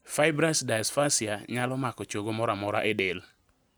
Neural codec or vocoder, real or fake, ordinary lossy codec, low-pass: none; real; none; none